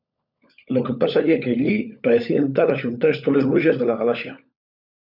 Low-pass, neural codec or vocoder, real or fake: 5.4 kHz; codec, 16 kHz, 16 kbps, FunCodec, trained on LibriTTS, 50 frames a second; fake